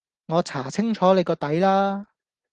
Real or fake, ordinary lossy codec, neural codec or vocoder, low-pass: real; Opus, 16 kbps; none; 9.9 kHz